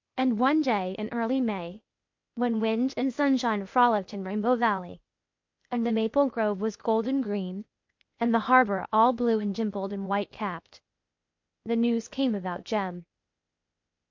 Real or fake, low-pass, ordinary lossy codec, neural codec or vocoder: fake; 7.2 kHz; MP3, 48 kbps; codec, 16 kHz, 0.8 kbps, ZipCodec